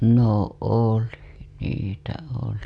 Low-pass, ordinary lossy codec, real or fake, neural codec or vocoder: none; none; real; none